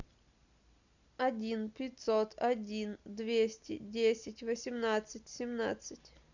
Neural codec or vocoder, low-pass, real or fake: none; 7.2 kHz; real